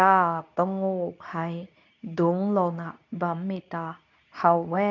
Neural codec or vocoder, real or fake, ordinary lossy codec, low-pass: codec, 24 kHz, 0.9 kbps, WavTokenizer, medium speech release version 2; fake; none; 7.2 kHz